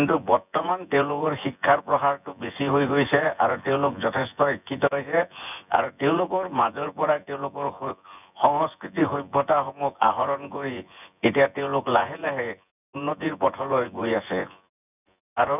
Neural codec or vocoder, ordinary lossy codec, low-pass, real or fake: vocoder, 24 kHz, 100 mel bands, Vocos; none; 3.6 kHz; fake